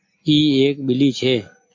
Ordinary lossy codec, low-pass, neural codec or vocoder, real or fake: AAC, 48 kbps; 7.2 kHz; none; real